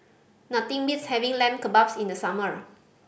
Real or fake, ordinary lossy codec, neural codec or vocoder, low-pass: real; none; none; none